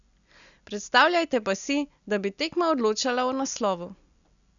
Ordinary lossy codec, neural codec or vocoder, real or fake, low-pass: none; none; real; 7.2 kHz